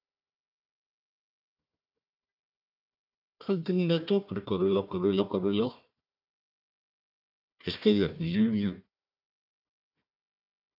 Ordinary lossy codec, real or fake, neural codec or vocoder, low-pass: AAC, 48 kbps; fake; codec, 16 kHz, 1 kbps, FunCodec, trained on Chinese and English, 50 frames a second; 5.4 kHz